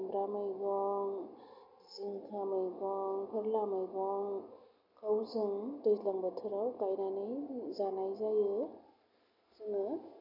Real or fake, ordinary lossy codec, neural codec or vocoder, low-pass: real; none; none; 5.4 kHz